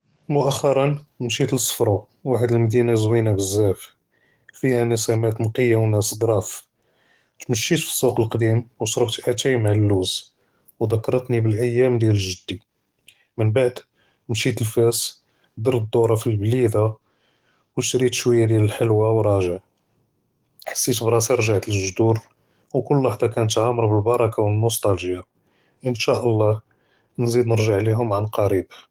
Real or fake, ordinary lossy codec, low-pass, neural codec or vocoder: fake; Opus, 24 kbps; 19.8 kHz; codec, 44.1 kHz, 7.8 kbps, DAC